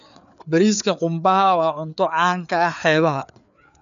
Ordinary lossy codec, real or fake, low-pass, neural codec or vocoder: none; fake; 7.2 kHz; codec, 16 kHz, 4 kbps, FreqCodec, larger model